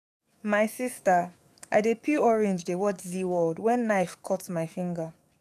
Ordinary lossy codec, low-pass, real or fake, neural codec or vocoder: none; 14.4 kHz; fake; codec, 44.1 kHz, 7.8 kbps, DAC